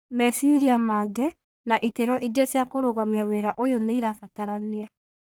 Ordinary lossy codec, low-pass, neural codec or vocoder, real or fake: none; none; codec, 44.1 kHz, 1.7 kbps, Pupu-Codec; fake